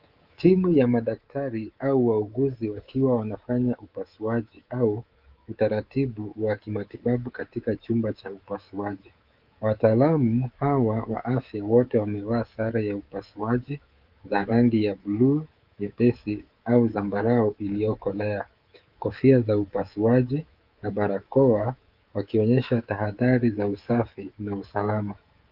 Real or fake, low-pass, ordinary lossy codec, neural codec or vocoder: fake; 5.4 kHz; Opus, 32 kbps; codec, 24 kHz, 3.1 kbps, DualCodec